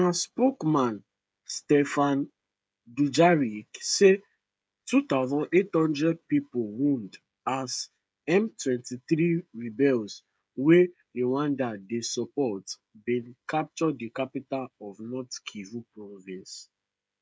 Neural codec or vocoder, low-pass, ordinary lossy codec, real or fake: codec, 16 kHz, 16 kbps, FreqCodec, smaller model; none; none; fake